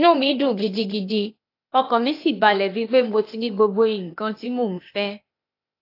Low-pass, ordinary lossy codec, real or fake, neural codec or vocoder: 5.4 kHz; AAC, 32 kbps; fake; codec, 16 kHz, 0.8 kbps, ZipCodec